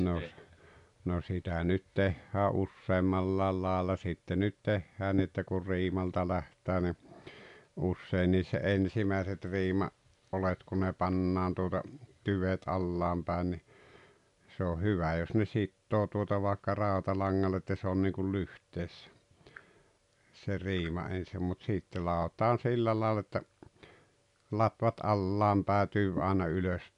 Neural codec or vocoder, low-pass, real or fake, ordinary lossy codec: none; 10.8 kHz; real; none